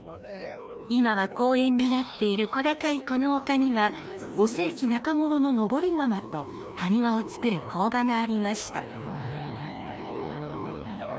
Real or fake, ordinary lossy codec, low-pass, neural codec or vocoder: fake; none; none; codec, 16 kHz, 1 kbps, FreqCodec, larger model